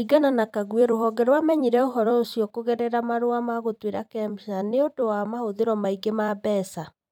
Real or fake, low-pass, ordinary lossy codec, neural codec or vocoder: fake; 19.8 kHz; none; vocoder, 44.1 kHz, 128 mel bands every 256 samples, BigVGAN v2